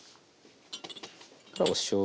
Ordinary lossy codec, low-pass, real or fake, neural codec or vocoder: none; none; real; none